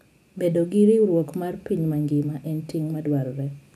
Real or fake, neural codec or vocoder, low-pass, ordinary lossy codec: real; none; 14.4 kHz; none